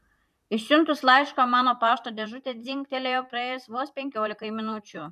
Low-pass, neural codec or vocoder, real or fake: 14.4 kHz; vocoder, 44.1 kHz, 128 mel bands, Pupu-Vocoder; fake